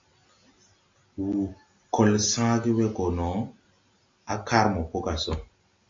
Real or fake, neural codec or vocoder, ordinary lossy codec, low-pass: real; none; AAC, 48 kbps; 7.2 kHz